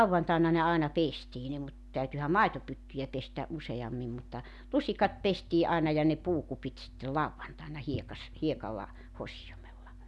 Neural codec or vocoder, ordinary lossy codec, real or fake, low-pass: none; none; real; none